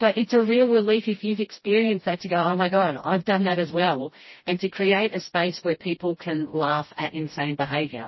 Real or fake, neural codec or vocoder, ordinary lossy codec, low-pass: fake; codec, 16 kHz, 1 kbps, FreqCodec, smaller model; MP3, 24 kbps; 7.2 kHz